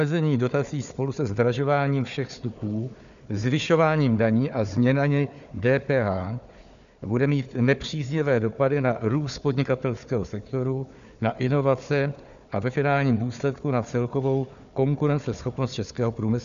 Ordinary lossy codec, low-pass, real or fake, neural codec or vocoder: MP3, 96 kbps; 7.2 kHz; fake; codec, 16 kHz, 4 kbps, FunCodec, trained on Chinese and English, 50 frames a second